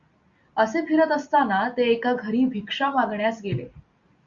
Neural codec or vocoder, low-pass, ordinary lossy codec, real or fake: none; 7.2 kHz; Opus, 64 kbps; real